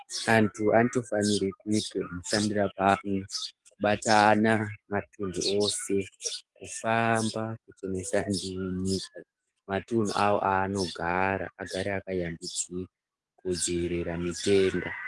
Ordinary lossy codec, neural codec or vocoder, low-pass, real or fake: Opus, 24 kbps; codec, 44.1 kHz, 7.8 kbps, DAC; 10.8 kHz; fake